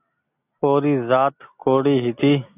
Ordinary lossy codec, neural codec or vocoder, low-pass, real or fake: AAC, 24 kbps; none; 3.6 kHz; real